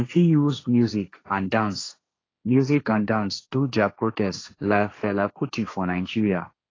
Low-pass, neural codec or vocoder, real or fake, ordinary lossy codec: 7.2 kHz; codec, 16 kHz, 1.1 kbps, Voila-Tokenizer; fake; AAC, 32 kbps